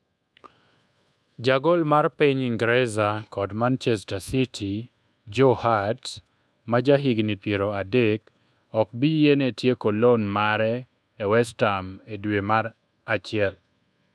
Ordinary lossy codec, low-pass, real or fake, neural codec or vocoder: none; none; fake; codec, 24 kHz, 1.2 kbps, DualCodec